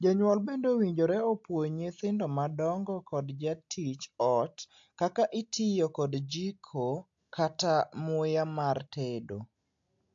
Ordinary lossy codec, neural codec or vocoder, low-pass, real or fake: AAC, 64 kbps; none; 7.2 kHz; real